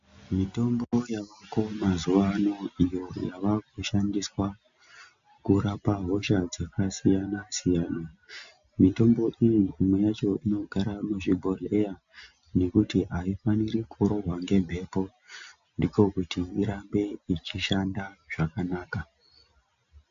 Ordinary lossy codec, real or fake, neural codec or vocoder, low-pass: MP3, 64 kbps; real; none; 7.2 kHz